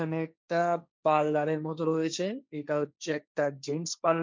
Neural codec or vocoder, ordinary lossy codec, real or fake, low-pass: codec, 16 kHz, 1.1 kbps, Voila-Tokenizer; none; fake; none